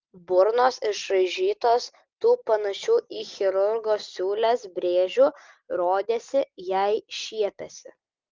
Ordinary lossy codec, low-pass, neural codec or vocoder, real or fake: Opus, 32 kbps; 7.2 kHz; none; real